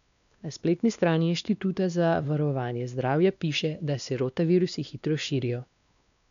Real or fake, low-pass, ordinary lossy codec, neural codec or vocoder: fake; 7.2 kHz; none; codec, 16 kHz, 2 kbps, X-Codec, WavLM features, trained on Multilingual LibriSpeech